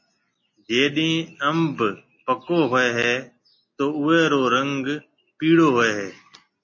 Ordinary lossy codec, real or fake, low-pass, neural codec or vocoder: MP3, 32 kbps; real; 7.2 kHz; none